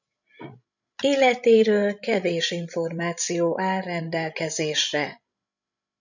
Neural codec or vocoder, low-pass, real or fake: codec, 16 kHz, 16 kbps, FreqCodec, larger model; 7.2 kHz; fake